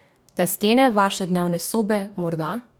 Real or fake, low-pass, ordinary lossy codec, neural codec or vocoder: fake; none; none; codec, 44.1 kHz, 2.6 kbps, DAC